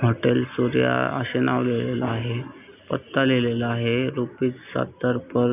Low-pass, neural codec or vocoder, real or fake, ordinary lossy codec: 3.6 kHz; none; real; none